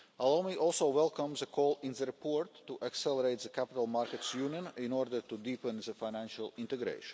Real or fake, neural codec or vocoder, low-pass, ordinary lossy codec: real; none; none; none